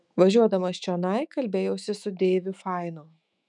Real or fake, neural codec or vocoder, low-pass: fake; autoencoder, 48 kHz, 128 numbers a frame, DAC-VAE, trained on Japanese speech; 10.8 kHz